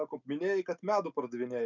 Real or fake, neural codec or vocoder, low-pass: real; none; 7.2 kHz